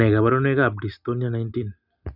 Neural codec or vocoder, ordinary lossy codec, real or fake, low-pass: none; none; real; 5.4 kHz